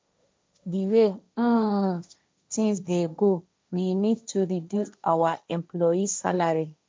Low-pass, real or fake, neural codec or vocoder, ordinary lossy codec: none; fake; codec, 16 kHz, 1.1 kbps, Voila-Tokenizer; none